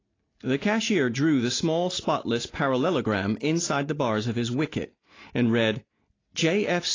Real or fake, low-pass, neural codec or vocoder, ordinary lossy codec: real; 7.2 kHz; none; AAC, 32 kbps